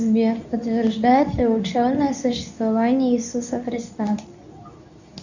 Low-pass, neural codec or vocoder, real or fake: 7.2 kHz; codec, 24 kHz, 0.9 kbps, WavTokenizer, medium speech release version 2; fake